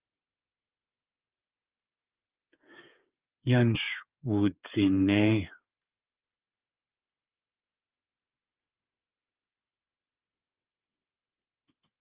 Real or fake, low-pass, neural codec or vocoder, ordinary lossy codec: fake; 3.6 kHz; codec, 16 kHz, 8 kbps, FreqCodec, smaller model; Opus, 32 kbps